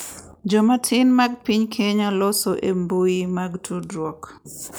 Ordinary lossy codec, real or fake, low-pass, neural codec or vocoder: none; real; none; none